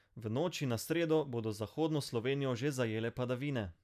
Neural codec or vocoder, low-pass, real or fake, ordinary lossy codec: none; 14.4 kHz; real; none